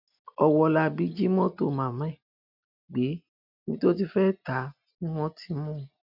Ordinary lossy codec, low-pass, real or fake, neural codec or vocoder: none; 5.4 kHz; real; none